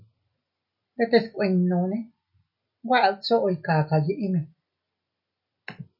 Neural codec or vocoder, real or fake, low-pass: none; real; 5.4 kHz